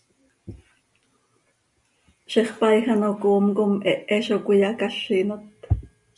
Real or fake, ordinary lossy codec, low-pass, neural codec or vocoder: real; MP3, 96 kbps; 10.8 kHz; none